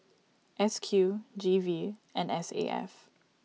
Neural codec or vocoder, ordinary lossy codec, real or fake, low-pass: none; none; real; none